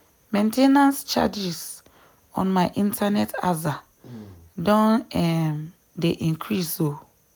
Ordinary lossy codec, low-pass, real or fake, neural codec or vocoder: none; none; real; none